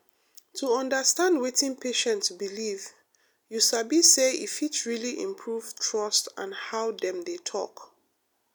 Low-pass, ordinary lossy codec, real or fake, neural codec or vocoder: none; none; real; none